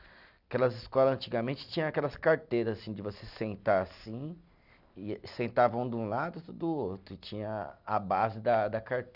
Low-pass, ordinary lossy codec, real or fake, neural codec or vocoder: 5.4 kHz; none; real; none